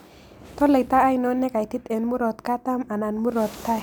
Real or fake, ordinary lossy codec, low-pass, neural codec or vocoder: real; none; none; none